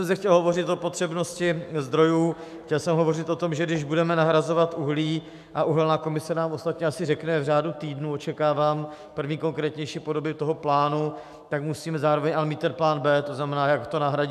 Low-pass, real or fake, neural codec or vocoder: 14.4 kHz; fake; autoencoder, 48 kHz, 128 numbers a frame, DAC-VAE, trained on Japanese speech